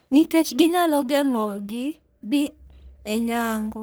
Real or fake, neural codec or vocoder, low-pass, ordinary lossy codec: fake; codec, 44.1 kHz, 1.7 kbps, Pupu-Codec; none; none